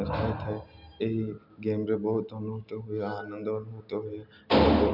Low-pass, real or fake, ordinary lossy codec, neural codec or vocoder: 5.4 kHz; real; none; none